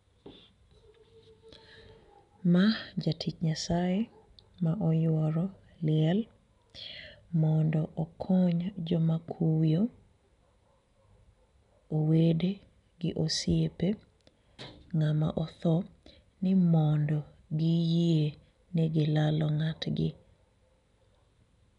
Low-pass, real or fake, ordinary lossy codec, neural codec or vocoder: 10.8 kHz; real; none; none